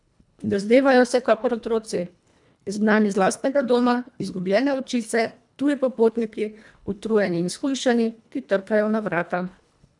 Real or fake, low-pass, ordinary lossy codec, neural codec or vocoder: fake; 10.8 kHz; none; codec, 24 kHz, 1.5 kbps, HILCodec